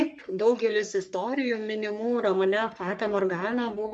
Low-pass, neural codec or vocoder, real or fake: 10.8 kHz; codec, 44.1 kHz, 3.4 kbps, Pupu-Codec; fake